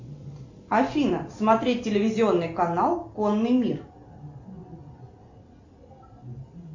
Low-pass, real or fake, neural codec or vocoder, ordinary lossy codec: 7.2 kHz; real; none; MP3, 48 kbps